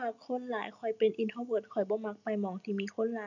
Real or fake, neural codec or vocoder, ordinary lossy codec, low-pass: real; none; none; 7.2 kHz